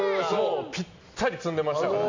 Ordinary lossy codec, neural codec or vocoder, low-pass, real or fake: MP3, 48 kbps; none; 7.2 kHz; real